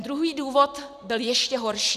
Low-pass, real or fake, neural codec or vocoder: 14.4 kHz; real; none